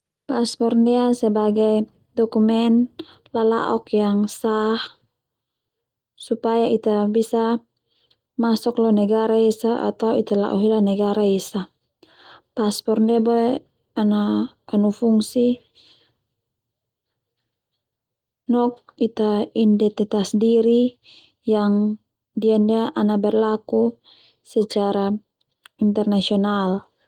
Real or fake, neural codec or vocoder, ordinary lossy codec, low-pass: real; none; Opus, 24 kbps; 19.8 kHz